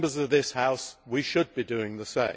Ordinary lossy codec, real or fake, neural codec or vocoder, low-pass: none; real; none; none